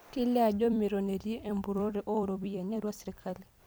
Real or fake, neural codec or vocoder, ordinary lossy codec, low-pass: fake; vocoder, 44.1 kHz, 128 mel bands every 256 samples, BigVGAN v2; none; none